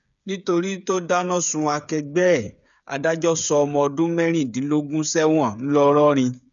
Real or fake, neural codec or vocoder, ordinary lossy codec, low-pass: fake; codec, 16 kHz, 8 kbps, FreqCodec, smaller model; none; 7.2 kHz